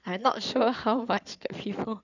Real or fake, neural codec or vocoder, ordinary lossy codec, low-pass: fake; codec, 16 kHz in and 24 kHz out, 2.2 kbps, FireRedTTS-2 codec; none; 7.2 kHz